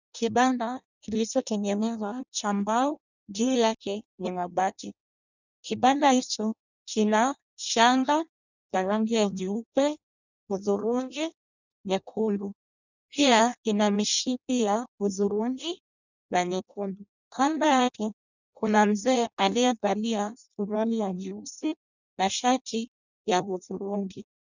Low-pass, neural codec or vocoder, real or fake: 7.2 kHz; codec, 16 kHz in and 24 kHz out, 0.6 kbps, FireRedTTS-2 codec; fake